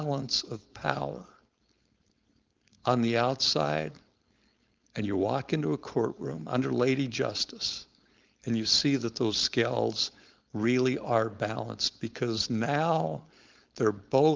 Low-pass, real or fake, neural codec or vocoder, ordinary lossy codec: 7.2 kHz; fake; codec, 16 kHz, 4.8 kbps, FACodec; Opus, 32 kbps